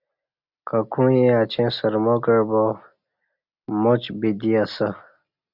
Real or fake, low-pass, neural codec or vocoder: real; 5.4 kHz; none